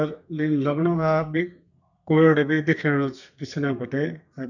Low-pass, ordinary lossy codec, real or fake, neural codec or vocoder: 7.2 kHz; none; fake; codec, 32 kHz, 1.9 kbps, SNAC